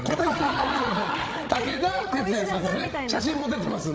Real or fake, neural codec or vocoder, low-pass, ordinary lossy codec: fake; codec, 16 kHz, 16 kbps, FreqCodec, larger model; none; none